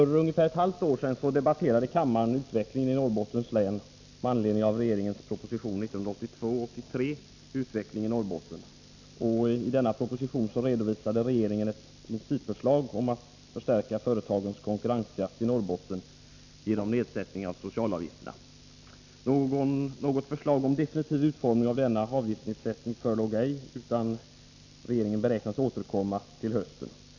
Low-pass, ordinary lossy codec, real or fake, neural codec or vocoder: 7.2 kHz; none; real; none